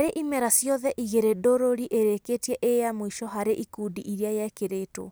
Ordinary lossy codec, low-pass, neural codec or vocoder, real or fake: none; none; none; real